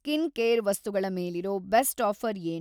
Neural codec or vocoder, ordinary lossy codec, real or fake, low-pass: none; none; real; none